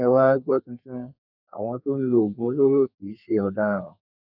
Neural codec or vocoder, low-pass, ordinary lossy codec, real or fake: codec, 32 kHz, 1.9 kbps, SNAC; 5.4 kHz; none; fake